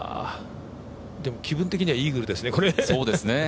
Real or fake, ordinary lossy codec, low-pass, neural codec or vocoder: real; none; none; none